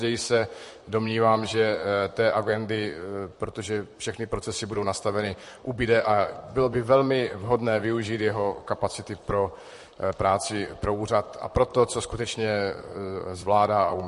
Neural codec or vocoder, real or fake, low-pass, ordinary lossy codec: vocoder, 44.1 kHz, 128 mel bands, Pupu-Vocoder; fake; 14.4 kHz; MP3, 48 kbps